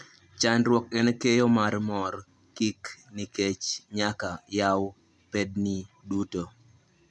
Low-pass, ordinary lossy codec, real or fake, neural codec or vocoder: none; none; real; none